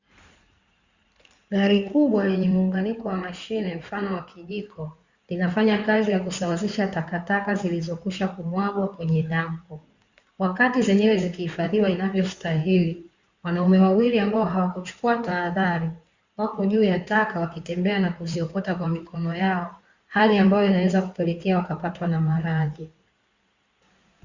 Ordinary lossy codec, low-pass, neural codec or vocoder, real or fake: Opus, 64 kbps; 7.2 kHz; codec, 16 kHz in and 24 kHz out, 2.2 kbps, FireRedTTS-2 codec; fake